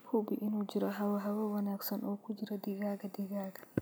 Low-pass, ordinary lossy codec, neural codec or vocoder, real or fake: none; none; none; real